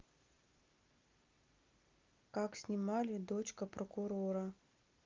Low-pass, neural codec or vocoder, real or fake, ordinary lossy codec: 7.2 kHz; none; real; Opus, 24 kbps